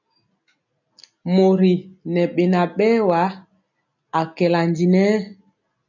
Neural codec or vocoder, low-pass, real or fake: none; 7.2 kHz; real